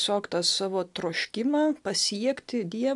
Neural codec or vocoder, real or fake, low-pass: none; real; 10.8 kHz